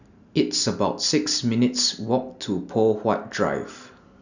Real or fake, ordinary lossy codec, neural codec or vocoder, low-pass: real; none; none; 7.2 kHz